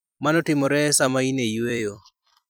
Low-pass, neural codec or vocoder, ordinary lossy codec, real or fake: none; vocoder, 44.1 kHz, 128 mel bands every 256 samples, BigVGAN v2; none; fake